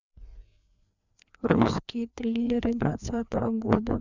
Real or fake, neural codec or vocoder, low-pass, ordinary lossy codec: fake; codec, 16 kHz, 2 kbps, FreqCodec, larger model; 7.2 kHz; none